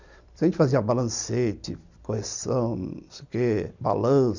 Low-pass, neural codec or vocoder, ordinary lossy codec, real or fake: 7.2 kHz; vocoder, 44.1 kHz, 128 mel bands every 256 samples, BigVGAN v2; none; fake